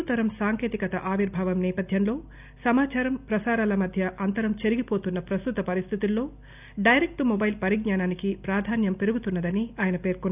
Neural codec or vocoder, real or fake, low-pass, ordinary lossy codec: none; real; 3.6 kHz; none